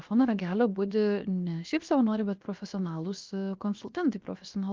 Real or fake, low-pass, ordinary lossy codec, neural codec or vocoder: fake; 7.2 kHz; Opus, 24 kbps; codec, 16 kHz, 0.7 kbps, FocalCodec